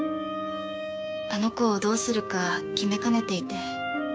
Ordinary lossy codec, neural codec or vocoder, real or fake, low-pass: none; codec, 16 kHz, 6 kbps, DAC; fake; none